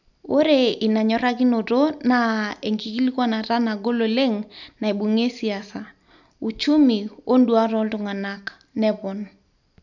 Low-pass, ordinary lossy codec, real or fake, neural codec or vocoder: 7.2 kHz; none; real; none